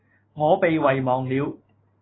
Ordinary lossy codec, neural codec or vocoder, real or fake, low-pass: AAC, 16 kbps; none; real; 7.2 kHz